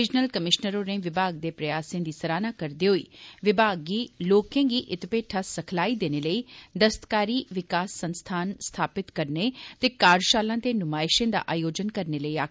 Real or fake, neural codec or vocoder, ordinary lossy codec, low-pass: real; none; none; none